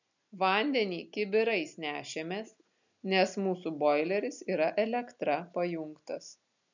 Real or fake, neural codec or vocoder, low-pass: real; none; 7.2 kHz